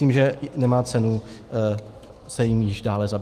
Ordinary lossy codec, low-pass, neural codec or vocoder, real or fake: Opus, 24 kbps; 14.4 kHz; autoencoder, 48 kHz, 128 numbers a frame, DAC-VAE, trained on Japanese speech; fake